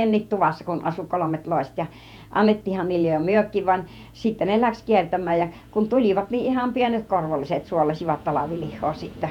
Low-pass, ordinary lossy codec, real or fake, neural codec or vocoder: 19.8 kHz; none; fake; vocoder, 48 kHz, 128 mel bands, Vocos